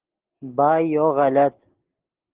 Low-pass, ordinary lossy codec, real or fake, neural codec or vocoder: 3.6 kHz; Opus, 16 kbps; real; none